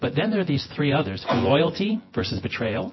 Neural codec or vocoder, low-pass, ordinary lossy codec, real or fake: vocoder, 24 kHz, 100 mel bands, Vocos; 7.2 kHz; MP3, 24 kbps; fake